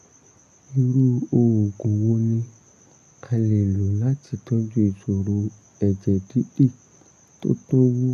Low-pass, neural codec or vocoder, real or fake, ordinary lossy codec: 14.4 kHz; none; real; none